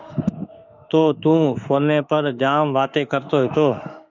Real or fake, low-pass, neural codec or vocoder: fake; 7.2 kHz; autoencoder, 48 kHz, 32 numbers a frame, DAC-VAE, trained on Japanese speech